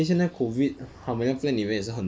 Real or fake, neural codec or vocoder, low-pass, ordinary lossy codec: real; none; none; none